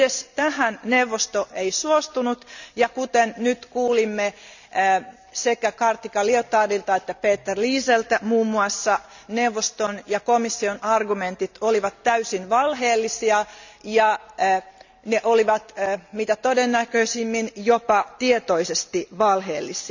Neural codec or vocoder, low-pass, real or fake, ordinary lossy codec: none; 7.2 kHz; real; none